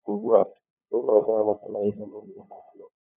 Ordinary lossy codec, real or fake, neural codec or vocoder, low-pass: none; fake; codec, 16 kHz, 2 kbps, FunCodec, trained on LibriTTS, 25 frames a second; 3.6 kHz